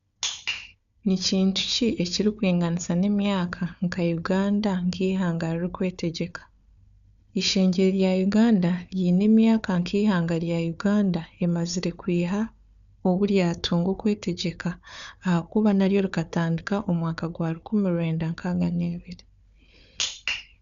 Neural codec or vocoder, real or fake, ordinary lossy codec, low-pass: codec, 16 kHz, 4 kbps, FunCodec, trained on Chinese and English, 50 frames a second; fake; none; 7.2 kHz